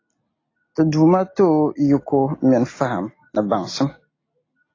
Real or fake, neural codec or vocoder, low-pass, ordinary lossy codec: real; none; 7.2 kHz; AAC, 32 kbps